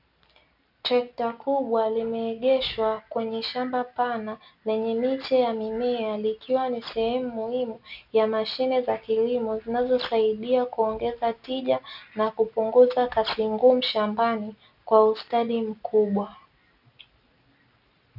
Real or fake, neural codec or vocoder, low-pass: real; none; 5.4 kHz